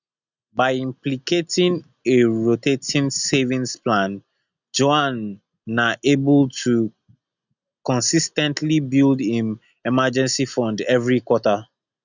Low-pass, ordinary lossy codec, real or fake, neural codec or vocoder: 7.2 kHz; none; real; none